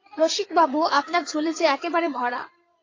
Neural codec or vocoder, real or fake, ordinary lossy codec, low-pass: codec, 16 kHz in and 24 kHz out, 2.2 kbps, FireRedTTS-2 codec; fake; AAC, 32 kbps; 7.2 kHz